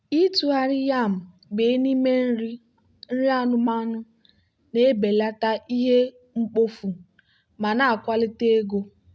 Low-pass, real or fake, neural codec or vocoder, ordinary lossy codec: none; real; none; none